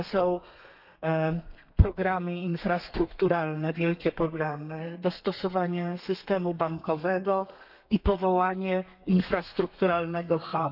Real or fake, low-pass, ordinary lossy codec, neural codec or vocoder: fake; 5.4 kHz; none; codec, 32 kHz, 1.9 kbps, SNAC